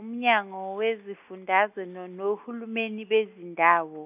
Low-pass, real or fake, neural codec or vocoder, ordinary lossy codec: 3.6 kHz; real; none; none